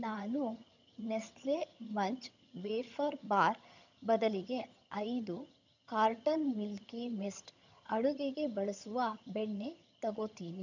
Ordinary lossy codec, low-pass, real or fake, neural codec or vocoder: none; 7.2 kHz; fake; vocoder, 22.05 kHz, 80 mel bands, HiFi-GAN